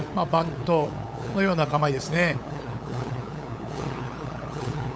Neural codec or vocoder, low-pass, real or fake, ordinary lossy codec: codec, 16 kHz, 8 kbps, FunCodec, trained on LibriTTS, 25 frames a second; none; fake; none